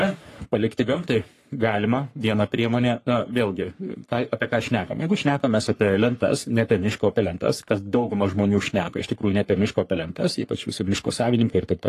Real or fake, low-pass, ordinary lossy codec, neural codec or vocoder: fake; 14.4 kHz; AAC, 48 kbps; codec, 44.1 kHz, 3.4 kbps, Pupu-Codec